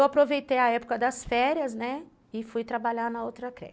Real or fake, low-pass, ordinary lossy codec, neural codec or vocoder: real; none; none; none